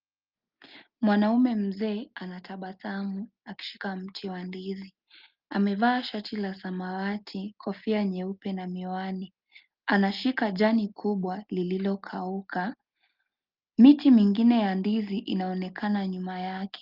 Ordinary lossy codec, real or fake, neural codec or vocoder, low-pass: Opus, 24 kbps; real; none; 5.4 kHz